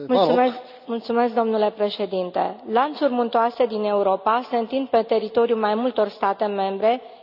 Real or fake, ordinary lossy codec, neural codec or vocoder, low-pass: real; none; none; 5.4 kHz